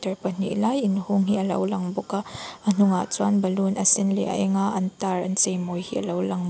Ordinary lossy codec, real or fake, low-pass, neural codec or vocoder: none; real; none; none